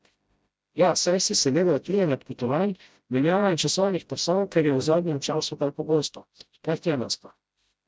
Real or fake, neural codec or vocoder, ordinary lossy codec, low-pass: fake; codec, 16 kHz, 0.5 kbps, FreqCodec, smaller model; none; none